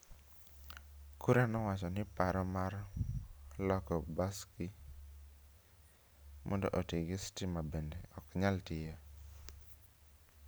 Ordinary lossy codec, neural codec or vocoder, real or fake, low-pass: none; none; real; none